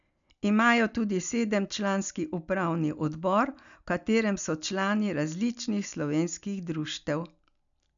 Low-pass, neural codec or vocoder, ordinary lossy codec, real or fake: 7.2 kHz; none; none; real